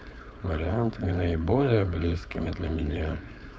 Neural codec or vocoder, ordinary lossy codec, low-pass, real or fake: codec, 16 kHz, 4.8 kbps, FACodec; none; none; fake